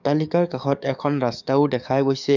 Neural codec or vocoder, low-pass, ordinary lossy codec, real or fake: codec, 44.1 kHz, 7.8 kbps, DAC; 7.2 kHz; none; fake